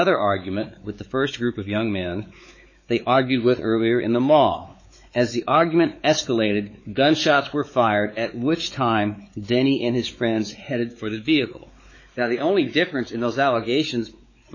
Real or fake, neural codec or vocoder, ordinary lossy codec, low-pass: fake; codec, 16 kHz, 4 kbps, X-Codec, WavLM features, trained on Multilingual LibriSpeech; MP3, 32 kbps; 7.2 kHz